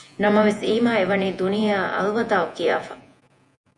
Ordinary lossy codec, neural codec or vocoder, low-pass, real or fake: MP3, 64 kbps; vocoder, 48 kHz, 128 mel bands, Vocos; 10.8 kHz; fake